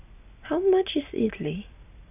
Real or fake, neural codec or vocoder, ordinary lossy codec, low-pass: real; none; none; 3.6 kHz